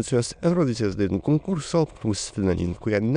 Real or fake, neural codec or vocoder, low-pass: fake; autoencoder, 22.05 kHz, a latent of 192 numbers a frame, VITS, trained on many speakers; 9.9 kHz